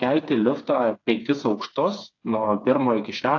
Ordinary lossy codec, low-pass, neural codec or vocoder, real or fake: AAC, 48 kbps; 7.2 kHz; codec, 16 kHz, 4 kbps, FreqCodec, smaller model; fake